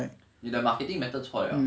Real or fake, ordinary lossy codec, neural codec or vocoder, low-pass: real; none; none; none